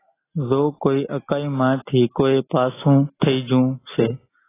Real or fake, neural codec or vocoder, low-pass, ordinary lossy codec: real; none; 3.6 kHz; AAC, 16 kbps